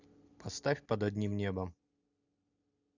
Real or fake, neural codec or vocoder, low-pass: real; none; 7.2 kHz